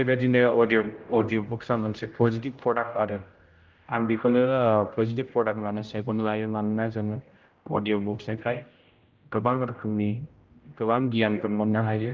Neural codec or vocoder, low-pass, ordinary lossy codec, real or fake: codec, 16 kHz, 0.5 kbps, X-Codec, HuBERT features, trained on general audio; 7.2 kHz; Opus, 32 kbps; fake